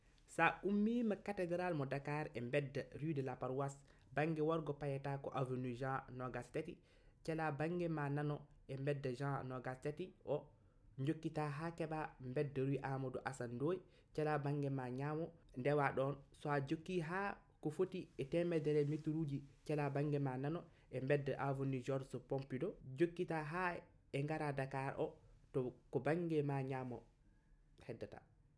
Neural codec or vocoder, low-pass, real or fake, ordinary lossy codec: none; none; real; none